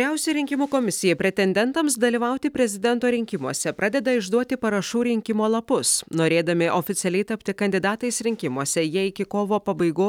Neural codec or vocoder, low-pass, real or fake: none; 19.8 kHz; real